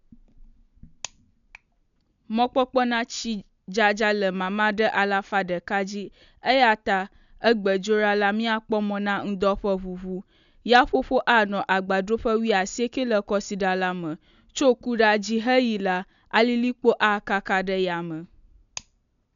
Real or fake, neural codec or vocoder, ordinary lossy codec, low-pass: real; none; none; 7.2 kHz